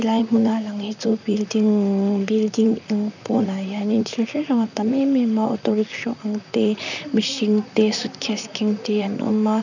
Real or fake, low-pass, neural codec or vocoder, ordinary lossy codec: fake; 7.2 kHz; vocoder, 44.1 kHz, 128 mel bands, Pupu-Vocoder; none